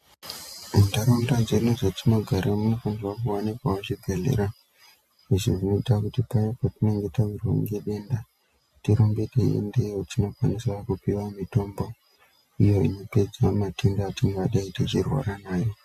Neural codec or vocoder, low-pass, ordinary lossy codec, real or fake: none; 14.4 kHz; MP3, 96 kbps; real